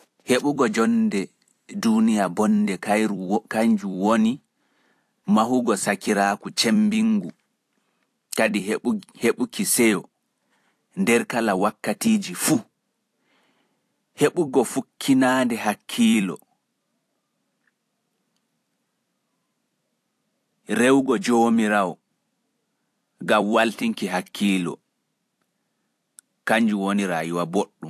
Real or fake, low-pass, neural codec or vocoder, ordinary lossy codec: fake; 14.4 kHz; vocoder, 44.1 kHz, 128 mel bands every 256 samples, BigVGAN v2; AAC, 64 kbps